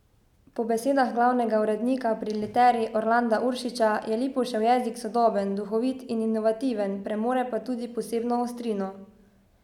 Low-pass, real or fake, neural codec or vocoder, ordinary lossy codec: 19.8 kHz; real; none; none